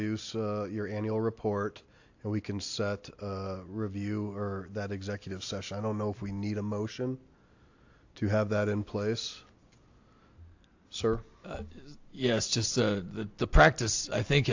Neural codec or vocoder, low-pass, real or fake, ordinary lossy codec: none; 7.2 kHz; real; AAC, 48 kbps